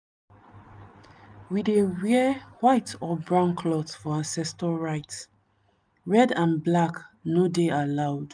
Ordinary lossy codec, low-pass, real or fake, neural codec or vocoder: none; 9.9 kHz; real; none